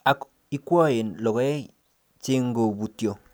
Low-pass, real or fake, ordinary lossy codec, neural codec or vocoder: none; real; none; none